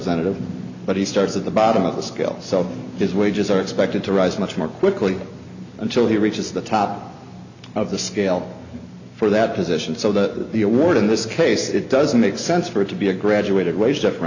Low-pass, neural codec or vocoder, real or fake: 7.2 kHz; none; real